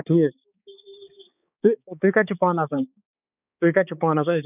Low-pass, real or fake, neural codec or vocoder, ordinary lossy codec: 3.6 kHz; fake; codec, 16 kHz, 4 kbps, X-Codec, HuBERT features, trained on balanced general audio; none